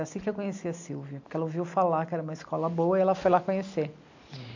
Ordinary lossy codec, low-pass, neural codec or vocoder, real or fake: AAC, 48 kbps; 7.2 kHz; none; real